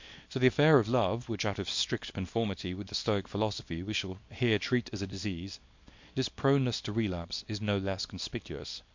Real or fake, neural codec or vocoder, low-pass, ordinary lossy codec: fake; codec, 24 kHz, 0.9 kbps, WavTokenizer, small release; 7.2 kHz; MP3, 48 kbps